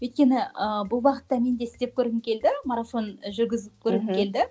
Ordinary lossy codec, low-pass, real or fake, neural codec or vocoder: none; none; real; none